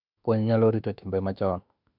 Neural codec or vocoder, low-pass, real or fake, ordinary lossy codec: autoencoder, 48 kHz, 32 numbers a frame, DAC-VAE, trained on Japanese speech; 5.4 kHz; fake; none